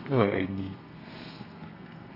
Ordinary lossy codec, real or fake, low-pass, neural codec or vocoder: none; fake; 5.4 kHz; codec, 32 kHz, 1.9 kbps, SNAC